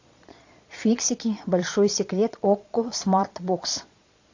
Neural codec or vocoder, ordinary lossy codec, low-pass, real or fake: vocoder, 22.05 kHz, 80 mel bands, Vocos; AAC, 48 kbps; 7.2 kHz; fake